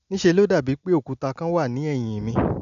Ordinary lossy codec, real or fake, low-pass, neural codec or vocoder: none; real; 7.2 kHz; none